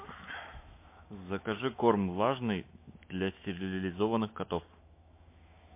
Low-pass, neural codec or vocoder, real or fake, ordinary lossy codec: 3.6 kHz; autoencoder, 48 kHz, 128 numbers a frame, DAC-VAE, trained on Japanese speech; fake; MP3, 24 kbps